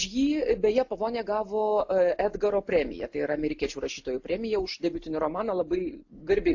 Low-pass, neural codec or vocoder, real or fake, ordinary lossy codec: 7.2 kHz; none; real; AAC, 48 kbps